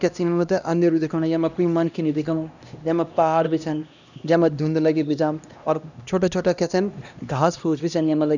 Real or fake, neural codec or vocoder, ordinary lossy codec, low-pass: fake; codec, 16 kHz, 1 kbps, X-Codec, HuBERT features, trained on LibriSpeech; none; 7.2 kHz